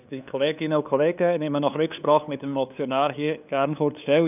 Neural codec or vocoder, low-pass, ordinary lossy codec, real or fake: codec, 16 kHz, 2 kbps, FunCodec, trained on LibriTTS, 25 frames a second; 3.6 kHz; none; fake